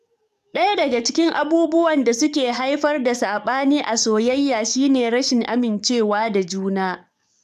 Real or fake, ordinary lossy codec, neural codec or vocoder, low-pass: fake; none; codec, 44.1 kHz, 7.8 kbps, DAC; 14.4 kHz